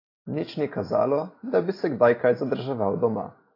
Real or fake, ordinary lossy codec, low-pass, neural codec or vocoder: real; AAC, 24 kbps; 5.4 kHz; none